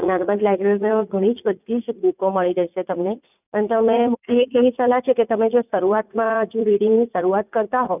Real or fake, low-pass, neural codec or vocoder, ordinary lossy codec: fake; 3.6 kHz; vocoder, 44.1 kHz, 80 mel bands, Vocos; none